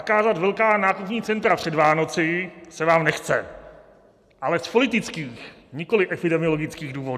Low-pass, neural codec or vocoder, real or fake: 14.4 kHz; none; real